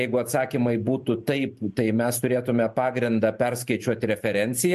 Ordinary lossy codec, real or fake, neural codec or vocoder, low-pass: MP3, 64 kbps; fake; vocoder, 44.1 kHz, 128 mel bands every 512 samples, BigVGAN v2; 14.4 kHz